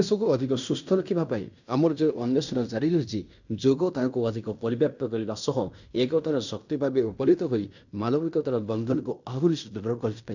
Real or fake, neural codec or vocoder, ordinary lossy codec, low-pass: fake; codec, 16 kHz in and 24 kHz out, 0.9 kbps, LongCat-Audio-Codec, fine tuned four codebook decoder; none; 7.2 kHz